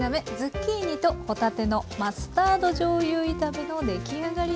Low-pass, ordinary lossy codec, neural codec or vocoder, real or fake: none; none; none; real